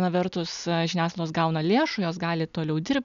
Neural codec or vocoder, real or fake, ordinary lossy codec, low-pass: none; real; MP3, 96 kbps; 7.2 kHz